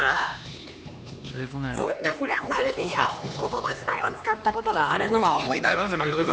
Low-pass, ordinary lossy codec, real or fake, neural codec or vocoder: none; none; fake; codec, 16 kHz, 2 kbps, X-Codec, HuBERT features, trained on LibriSpeech